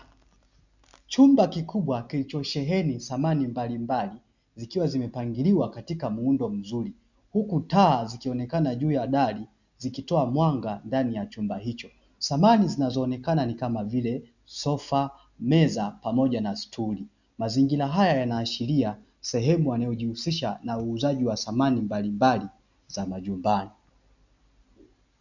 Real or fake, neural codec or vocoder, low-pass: real; none; 7.2 kHz